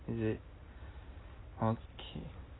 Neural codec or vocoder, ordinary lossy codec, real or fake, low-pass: none; AAC, 16 kbps; real; 7.2 kHz